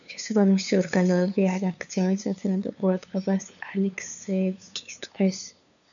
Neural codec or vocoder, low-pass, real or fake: codec, 16 kHz, 2 kbps, FunCodec, trained on LibriTTS, 25 frames a second; 7.2 kHz; fake